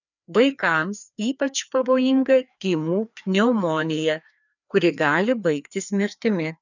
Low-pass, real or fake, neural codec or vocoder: 7.2 kHz; fake; codec, 16 kHz, 2 kbps, FreqCodec, larger model